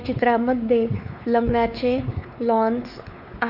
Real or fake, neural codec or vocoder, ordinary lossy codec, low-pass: fake; codec, 16 kHz, 4 kbps, X-Codec, WavLM features, trained on Multilingual LibriSpeech; none; 5.4 kHz